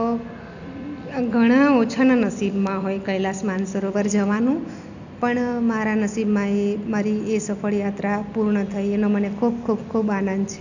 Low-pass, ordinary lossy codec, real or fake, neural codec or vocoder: 7.2 kHz; none; real; none